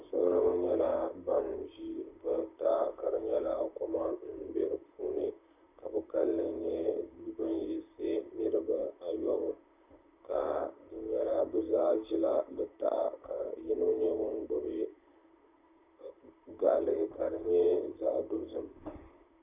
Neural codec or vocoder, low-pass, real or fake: vocoder, 44.1 kHz, 128 mel bands, Pupu-Vocoder; 3.6 kHz; fake